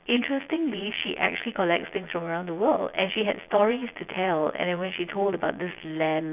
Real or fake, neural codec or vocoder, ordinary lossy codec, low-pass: fake; vocoder, 22.05 kHz, 80 mel bands, Vocos; none; 3.6 kHz